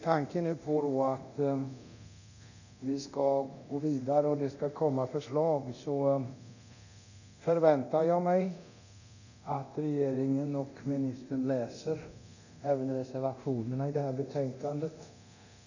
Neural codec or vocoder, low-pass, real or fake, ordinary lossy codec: codec, 24 kHz, 0.9 kbps, DualCodec; 7.2 kHz; fake; none